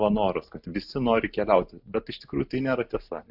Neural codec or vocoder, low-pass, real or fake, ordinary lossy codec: none; 5.4 kHz; real; AAC, 48 kbps